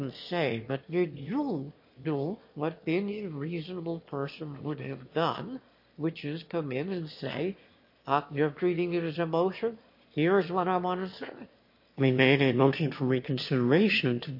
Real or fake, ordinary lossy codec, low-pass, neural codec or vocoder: fake; MP3, 32 kbps; 5.4 kHz; autoencoder, 22.05 kHz, a latent of 192 numbers a frame, VITS, trained on one speaker